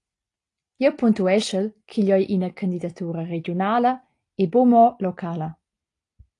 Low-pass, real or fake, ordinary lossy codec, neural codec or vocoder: 10.8 kHz; real; AAC, 48 kbps; none